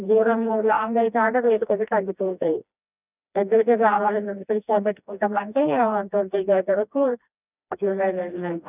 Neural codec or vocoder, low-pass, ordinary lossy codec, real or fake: codec, 16 kHz, 1 kbps, FreqCodec, smaller model; 3.6 kHz; none; fake